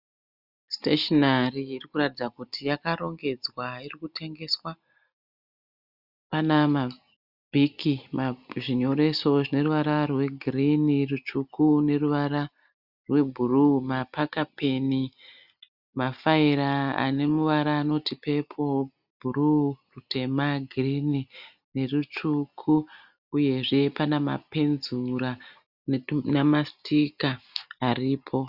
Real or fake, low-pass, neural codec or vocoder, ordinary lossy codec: real; 5.4 kHz; none; Opus, 64 kbps